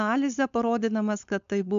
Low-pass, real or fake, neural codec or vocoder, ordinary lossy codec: 7.2 kHz; fake; codec, 16 kHz, 4.8 kbps, FACodec; AAC, 96 kbps